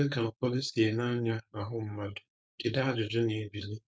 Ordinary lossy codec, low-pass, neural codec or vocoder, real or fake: none; none; codec, 16 kHz, 4.8 kbps, FACodec; fake